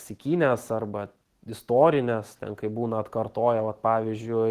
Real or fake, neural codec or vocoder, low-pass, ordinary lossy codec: real; none; 14.4 kHz; Opus, 16 kbps